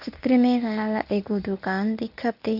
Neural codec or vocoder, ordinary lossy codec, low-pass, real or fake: codec, 24 kHz, 0.9 kbps, WavTokenizer, medium speech release version 1; none; 5.4 kHz; fake